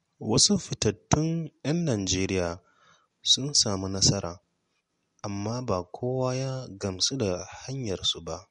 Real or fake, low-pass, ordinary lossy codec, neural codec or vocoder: real; 19.8 kHz; MP3, 48 kbps; none